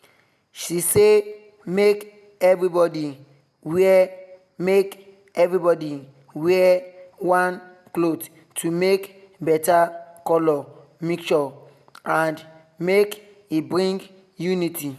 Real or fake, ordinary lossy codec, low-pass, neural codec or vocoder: real; none; 14.4 kHz; none